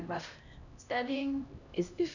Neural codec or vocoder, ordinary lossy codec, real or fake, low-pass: codec, 16 kHz, 1 kbps, X-Codec, HuBERT features, trained on LibriSpeech; none; fake; 7.2 kHz